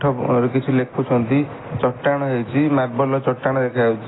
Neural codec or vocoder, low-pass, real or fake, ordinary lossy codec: none; 7.2 kHz; real; AAC, 16 kbps